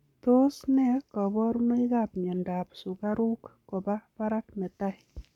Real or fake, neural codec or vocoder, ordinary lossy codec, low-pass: fake; codec, 44.1 kHz, 7.8 kbps, Pupu-Codec; none; 19.8 kHz